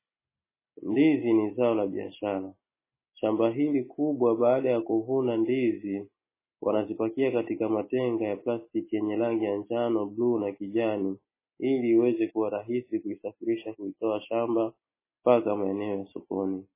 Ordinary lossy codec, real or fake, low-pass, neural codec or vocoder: MP3, 16 kbps; real; 3.6 kHz; none